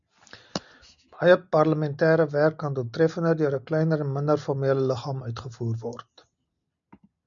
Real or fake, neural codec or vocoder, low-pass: real; none; 7.2 kHz